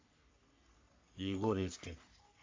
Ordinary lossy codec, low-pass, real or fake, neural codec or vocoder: MP3, 48 kbps; 7.2 kHz; fake; codec, 44.1 kHz, 3.4 kbps, Pupu-Codec